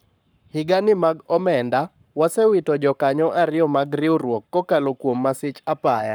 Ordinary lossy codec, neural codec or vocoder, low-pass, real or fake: none; codec, 44.1 kHz, 7.8 kbps, Pupu-Codec; none; fake